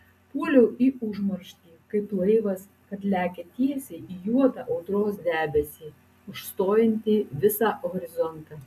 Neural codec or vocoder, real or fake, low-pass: none; real; 14.4 kHz